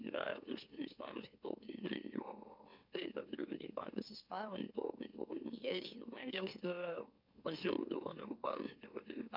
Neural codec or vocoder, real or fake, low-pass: autoencoder, 44.1 kHz, a latent of 192 numbers a frame, MeloTTS; fake; 5.4 kHz